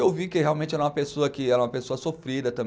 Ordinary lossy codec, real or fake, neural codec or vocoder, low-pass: none; real; none; none